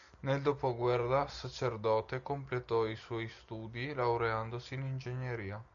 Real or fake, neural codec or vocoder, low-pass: real; none; 7.2 kHz